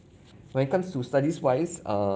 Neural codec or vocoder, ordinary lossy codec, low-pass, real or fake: none; none; none; real